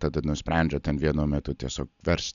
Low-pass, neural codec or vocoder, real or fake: 7.2 kHz; none; real